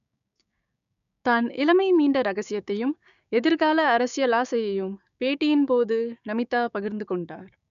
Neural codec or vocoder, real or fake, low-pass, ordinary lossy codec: codec, 16 kHz, 6 kbps, DAC; fake; 7.2 kHz; none